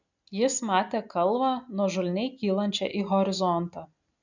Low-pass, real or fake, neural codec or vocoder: 7.2 kHz; real; none